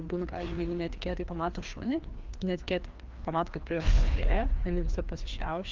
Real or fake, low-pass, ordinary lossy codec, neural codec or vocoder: fake; 7.2 kHz; Opus, 24 kbps; codec, 16 kHz, 2 kbps, FreqCodec, larger model